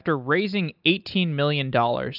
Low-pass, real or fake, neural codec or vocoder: 5.4 kHz; real; none